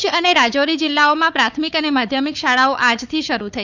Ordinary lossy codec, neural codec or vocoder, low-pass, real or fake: none; codec, 16 kHz, 4 kbps, FunCodec, trained on Chinese and English, 50 frames a second; 7.2 kHz; fake